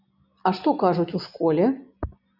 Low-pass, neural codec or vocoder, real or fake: 5.4 kHz; none; real